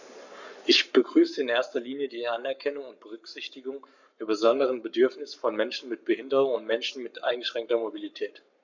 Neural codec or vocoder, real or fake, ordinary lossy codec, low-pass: codec, 44.1 kHz, 7.8 kbps, Pupu-Codec; fake; none; 7.2 kHz